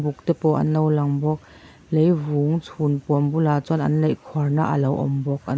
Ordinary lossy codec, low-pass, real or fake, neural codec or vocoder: none; none; real; none